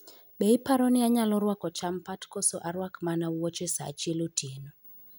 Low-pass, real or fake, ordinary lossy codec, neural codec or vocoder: none; real; none; none